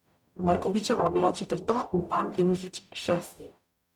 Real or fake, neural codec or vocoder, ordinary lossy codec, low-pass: fake; codec, 44.1 kHz, 0.9 kbps, DAC; none; 19.8 kHz